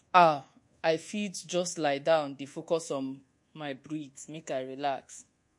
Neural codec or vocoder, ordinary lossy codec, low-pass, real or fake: codec, 24 kHz, 1.2 kbps, DualCodec; MP3, 48 kbps; 10.8 kHz; fake